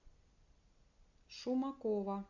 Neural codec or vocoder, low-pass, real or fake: none; 7.2 kHz; real